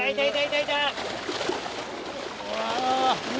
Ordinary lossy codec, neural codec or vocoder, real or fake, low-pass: none; none; real; none